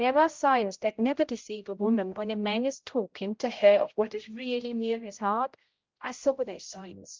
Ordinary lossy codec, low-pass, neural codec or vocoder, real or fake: Opus, 32 kbps; 7.2 kHz; codec, 16 kHz, 0.5 kbps, X-Codec, HuBERT features, trained on general audio; fake